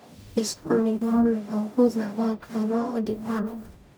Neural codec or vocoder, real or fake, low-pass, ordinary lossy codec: codec, 44.1 kHz, 0.9 kbps, DAC; fake; none; none